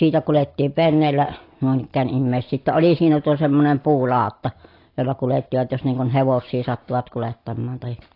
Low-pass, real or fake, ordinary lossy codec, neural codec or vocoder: 5.4 kHz; real; AAC, 32 kbps; none